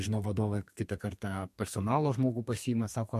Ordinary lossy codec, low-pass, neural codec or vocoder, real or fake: MP3, 64 kbps; 14.4 kHz; codec, 32 kHz, 1.9 kbps, SNAC; fake